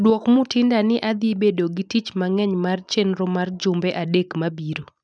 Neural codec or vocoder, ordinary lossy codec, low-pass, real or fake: none; none; 9.9 kHz; real